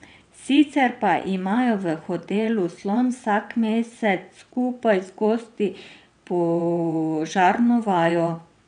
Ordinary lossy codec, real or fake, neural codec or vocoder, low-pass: none; fake; vocoder, 22.05 kHz, 80 mel bands, WaveNeXt; 9.9 kHz